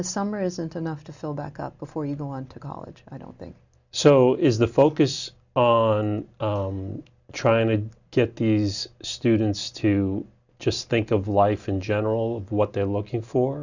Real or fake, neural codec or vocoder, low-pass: real; none; 7.2 kHz